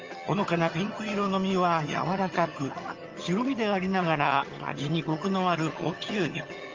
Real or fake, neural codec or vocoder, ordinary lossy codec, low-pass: fake; vocoder, 22.05 kHz, 80 mel bands, HiFi-GAN; Opus, 32 kbps; 7.2 kHz